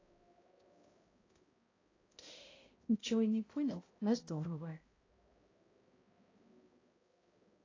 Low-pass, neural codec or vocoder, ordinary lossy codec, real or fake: 7.2 kHz; codec, 16 kHz, 0.5 kbps, X-Codec, HuBERT features, trained on balanced general audio; AAC, 32 kbps; fake